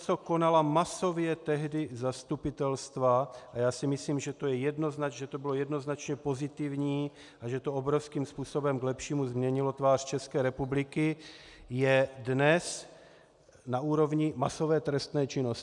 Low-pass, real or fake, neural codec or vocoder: 10.8 kHz; real; none